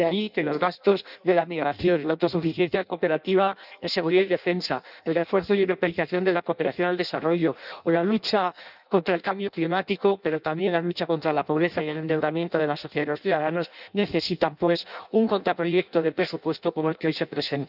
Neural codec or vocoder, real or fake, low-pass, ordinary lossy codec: codec, 16 kHz in and 24 kHz out, 0.6 kbps, FireRedTTS-2 codec; fake; 5.4 kHz; none